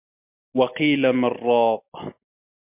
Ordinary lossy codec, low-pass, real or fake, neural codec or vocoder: MP3, 32 kbps; 3.6 kHz; real; none